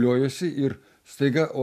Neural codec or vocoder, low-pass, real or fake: none; 14.4 kHz; real